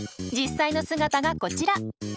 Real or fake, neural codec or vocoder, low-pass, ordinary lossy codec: real; none; none; none